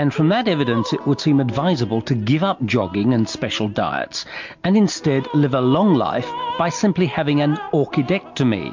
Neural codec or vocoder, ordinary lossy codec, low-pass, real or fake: none; MP3, 48 kbps; 7.2 kHz; real